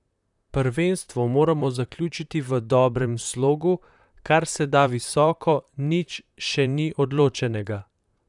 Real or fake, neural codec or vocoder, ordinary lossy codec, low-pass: fake; vocoder, 44.1 kHz, 128 mel bands, Pupu-Vocoder; none; 10.8 kHz